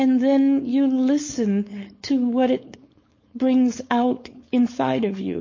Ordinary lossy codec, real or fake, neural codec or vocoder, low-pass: MP3, 32 kbps; fake; codec, 16 kHz, 4.8 kbps, FACodec; 7.2 kHz